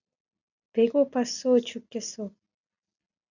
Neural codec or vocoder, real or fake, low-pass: none; real; 7.2 kHz